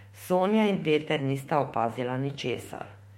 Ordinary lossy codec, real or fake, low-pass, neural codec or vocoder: MP3, 64 kbps; fake; 19.8 kHz; autoencoder, 48 kHz, 32 numbers a frame, DAC-VAE, trained on Japanese speech